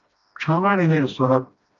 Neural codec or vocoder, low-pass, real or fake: codec, 16 kHz, 1 kbps, FreqCodec, smaller model; 7.2 kHz; fake